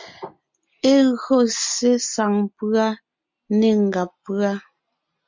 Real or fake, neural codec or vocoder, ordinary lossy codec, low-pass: real; none; MP3, 48 kbps; 7.2 kHz